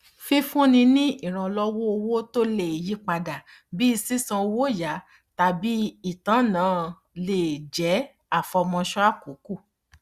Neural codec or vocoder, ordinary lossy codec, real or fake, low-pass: none; Opus, 64 kbps; real; 14.4 kHz